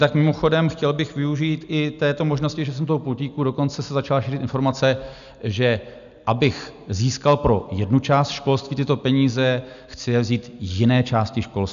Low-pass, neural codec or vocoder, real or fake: 7.2 kHz; none; real